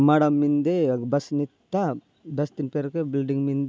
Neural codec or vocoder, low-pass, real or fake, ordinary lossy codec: none; none; real; none